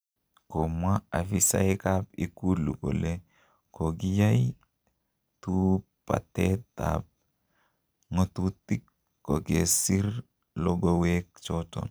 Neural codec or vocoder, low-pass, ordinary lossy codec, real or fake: none; none; none; real